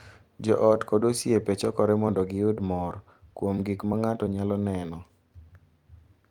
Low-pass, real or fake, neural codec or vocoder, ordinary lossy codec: 19.8 kHz; fake; vocoder, 44.1 kHz, 128 mel bands every 256 samples, BigVGAN v2; Opus, 32 kbps